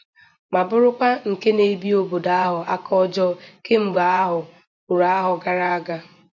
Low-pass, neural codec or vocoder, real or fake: 7.2 kHz; vocoder, 24 kHz, 100 mel bands, Vocos; fake